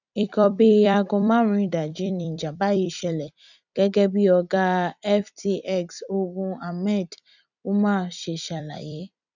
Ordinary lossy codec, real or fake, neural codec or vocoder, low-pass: none; fake; vocoder, 44.1 kHz, 128 mel bands every 256 samples, BigVGAN v2; 7.2 kHz